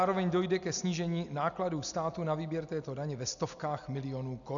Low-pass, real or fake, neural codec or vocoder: 7.2 kHz; real; none